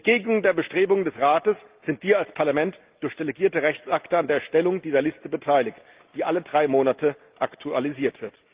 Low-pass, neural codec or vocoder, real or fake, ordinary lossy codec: 3.6 kHz; none; real; Opus, 32 kbps